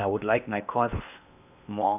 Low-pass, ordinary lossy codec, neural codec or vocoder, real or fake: 3.6 kHz; none; codec, 16 kHz in and 24 kHz out, 0.8 kbps, FocalCodec, streaming, 65536 codes; fake